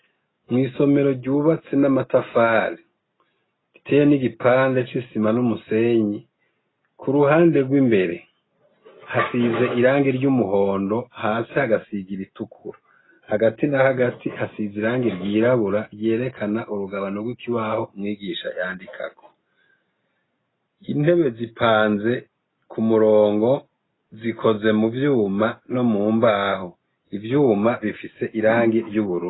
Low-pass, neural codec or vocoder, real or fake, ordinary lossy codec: 7.2 kHz; none; real; AAC, 16 kbps